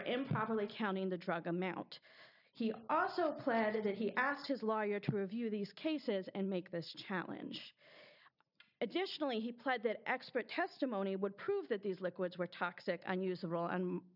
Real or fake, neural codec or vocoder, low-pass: real; none; 5.4 kHz